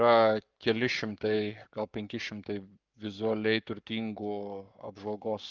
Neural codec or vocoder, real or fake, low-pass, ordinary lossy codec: codec, 44.1 kHz, 7.8 kbps, Pupu-Codec; fake; 7.2 kHz; Opus, 24 kbps